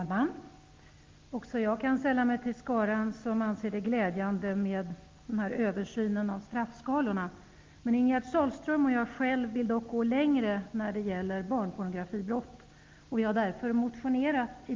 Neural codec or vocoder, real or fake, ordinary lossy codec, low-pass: none; real; Opus, 24 kbps; 7.2 kHz